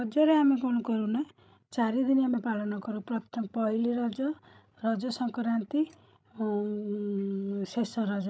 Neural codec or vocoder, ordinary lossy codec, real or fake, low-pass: codec, 16 kHz, 16 kbps, FreqCodec, larger model; none; fake; none